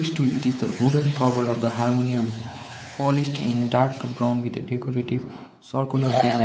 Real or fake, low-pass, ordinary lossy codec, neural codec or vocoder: fake; none; none; codec, 16 kHz, 4 kbps, X-Codec, WavLM features, trained on Multilingual LibriSpeech